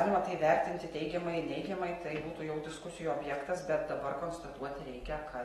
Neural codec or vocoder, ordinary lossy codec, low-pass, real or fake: none; AAC, 32 kbps; 19.8 kHz; real